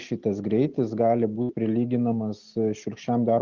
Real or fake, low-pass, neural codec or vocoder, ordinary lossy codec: real; 7.2 kHz; none; Opus, 32 kbps